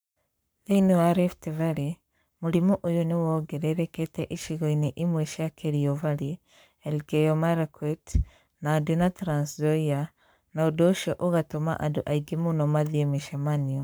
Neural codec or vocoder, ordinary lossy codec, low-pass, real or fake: codec, 44.1 kHz, 7.8 kbps, Pupu-Codec; none; none; fake